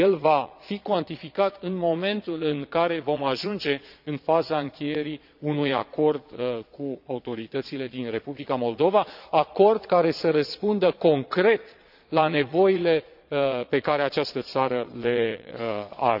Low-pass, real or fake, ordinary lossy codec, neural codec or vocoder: 5.4 kHz; fake; none; vocoder, 22.05 kHz, 80 mel bands, Vocos